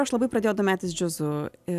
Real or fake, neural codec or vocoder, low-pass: real; none; 14.4 kHz